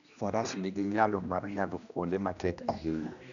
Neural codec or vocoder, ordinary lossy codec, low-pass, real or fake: codec, 16 kHz, 1 kbps, X-Codec, HuBERT features, trained on general audio; none; 7.2 kHz; fake